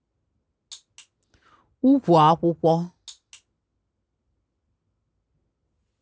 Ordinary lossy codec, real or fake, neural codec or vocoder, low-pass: none; real; none; none